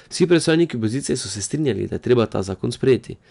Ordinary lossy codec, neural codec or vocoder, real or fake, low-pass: none; none; real; 10.8 kHz